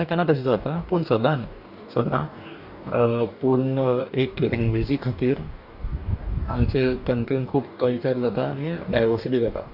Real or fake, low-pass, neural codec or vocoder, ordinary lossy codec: fake; 5.4 kHz; codec, 44.1 kHz, 2.6 kbps, DAC; AAC, 48 kbps